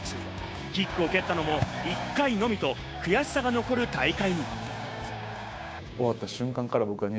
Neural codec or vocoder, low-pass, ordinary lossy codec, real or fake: codec, 16 kHz, 6 kbps, DAC; none; none; fake